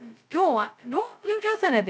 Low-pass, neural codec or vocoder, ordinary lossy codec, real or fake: none; codec, 16 kHz, 0.2 kbps, FocalCodec; none; fake